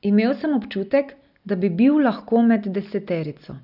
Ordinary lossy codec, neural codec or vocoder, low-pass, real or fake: AAC, 48 kbps; none; 5.4 kHz; real